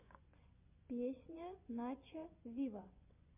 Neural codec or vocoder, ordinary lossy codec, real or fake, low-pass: vocoder, 24 kHz, 100 mel bands, Vocos; MP3, 32 kbps; fake; 3.6 kHz